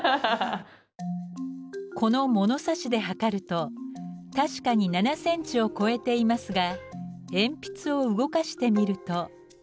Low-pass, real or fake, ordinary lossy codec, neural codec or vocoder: none; real; none; none